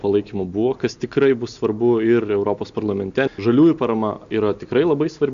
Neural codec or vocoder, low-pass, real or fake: none; 7.2 kHz; real